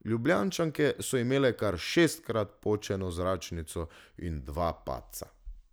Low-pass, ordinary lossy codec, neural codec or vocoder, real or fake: none; none; none; real